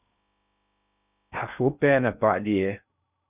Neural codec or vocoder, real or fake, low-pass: codec, 16 kHz in and 24 kHz out, 0.6 kbps, FocalCodec, streaming, 2048 codes; fake; 3.6 kHz